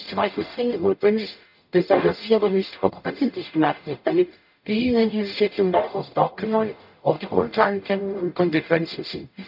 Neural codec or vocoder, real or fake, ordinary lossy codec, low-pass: codec, 44.1 kHz, 0.9 kbps, DAC; fake; none; 5.4 kHz